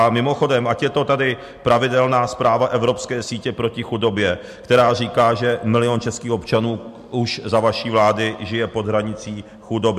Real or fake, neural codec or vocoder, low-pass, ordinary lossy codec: real; none; 14.4 kHz; MP3, 64 kbps